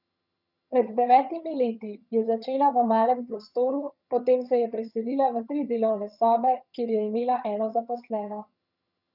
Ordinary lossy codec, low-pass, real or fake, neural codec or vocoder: none; 5.4 kHz; fake; vocoder, 22.05 kHz, 80 mel bands, HiFi-GAN